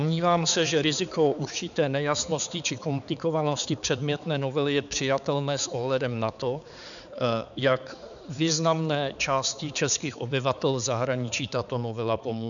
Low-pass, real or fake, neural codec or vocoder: 7.2 kHz; fake; codec, 16 kHz, 4 kbps, X-Codec, HuBERT features, trained on balanced general audio